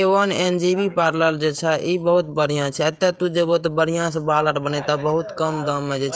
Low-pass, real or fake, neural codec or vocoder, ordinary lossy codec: none; fake; codec, 16 kHz, 16 kbps, FreqCodec, smaller model; none